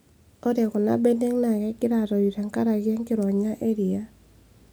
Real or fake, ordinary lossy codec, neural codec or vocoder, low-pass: real; none; none; none